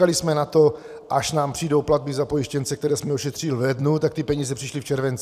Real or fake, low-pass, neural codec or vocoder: fake; 14.4 kHz; vocoder, 44.1 kHz, 128 mel bands every 256 samples, BigVGAN v2